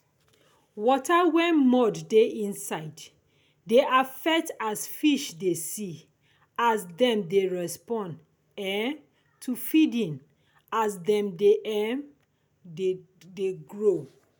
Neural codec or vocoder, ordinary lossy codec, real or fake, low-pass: none; none; real; none